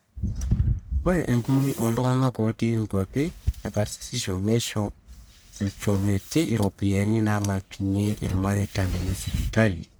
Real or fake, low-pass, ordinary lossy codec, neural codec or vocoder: fake; none; none; codec, 44.1 kHz, 1.7 kbps, Pupu-Codec